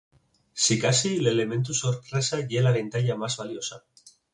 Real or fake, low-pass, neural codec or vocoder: real; 10.8 kHz; none